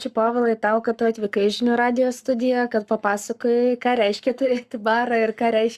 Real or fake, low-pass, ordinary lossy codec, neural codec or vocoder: fake; 14.4 kHz; Opus, 64 kbps; codec, 44.1 kHz, 7.8 kbps, Pupu-Codec